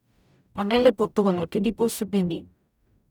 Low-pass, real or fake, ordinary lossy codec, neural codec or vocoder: 19.8 kHz; fake; none; codec, 44.1 kHz, 0.9 kbps, DAC